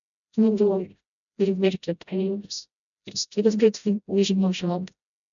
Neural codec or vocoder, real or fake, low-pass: codec, 16 kHz, 0.5 kbps, FreqCodec, smaller model; fake; 7.2 kHz